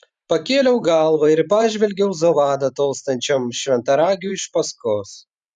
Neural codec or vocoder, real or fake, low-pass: vocoder, 44.1 kHz, 128 mel bands every 512 samples, BigVGAN v2; fake; 10.8 kHz